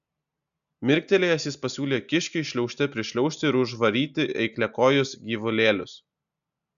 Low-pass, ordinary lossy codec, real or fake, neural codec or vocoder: 7.2 kHz; MP3, 96 kbps; real; none